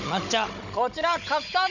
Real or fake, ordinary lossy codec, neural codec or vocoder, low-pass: fake; none; codec, 16 kHz, 16 kbps, FunCodec, trained on Chinese and English, 50 frames a second; 7.2 kHz